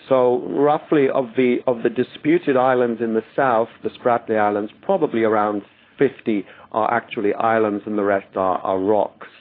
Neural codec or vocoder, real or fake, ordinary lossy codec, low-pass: codec, 16 kHz, 4.8 kbps, FACodec; fake; AAC, 24 kbps; 5.4 kHz